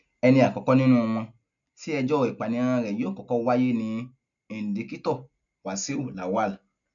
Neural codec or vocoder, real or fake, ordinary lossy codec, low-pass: none; real; none; 7.2 kHz